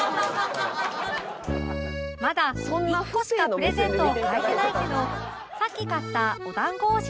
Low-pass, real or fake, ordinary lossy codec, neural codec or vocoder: none; real; none; none